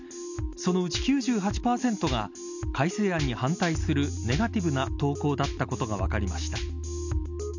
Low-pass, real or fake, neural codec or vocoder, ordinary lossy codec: 7.2 kHz; real; none; none